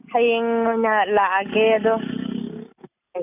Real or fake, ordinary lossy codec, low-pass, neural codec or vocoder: real; none; 3.6 kHz; none